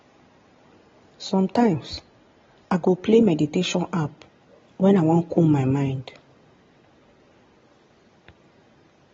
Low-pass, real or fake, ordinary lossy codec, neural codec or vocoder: 7.2 kHz; real; AAC, 24 kbps; none